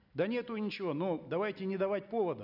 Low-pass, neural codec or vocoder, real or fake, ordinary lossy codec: 5.4 kHz; none; real; none